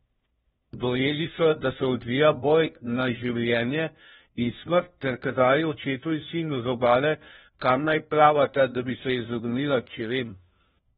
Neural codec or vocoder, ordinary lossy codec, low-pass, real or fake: codec, 16 kHz, 1 kbps, FunCodec, trained on LibriTTS, 50 frames a second; AAC, 16 kbps; 7.2 kHz; fake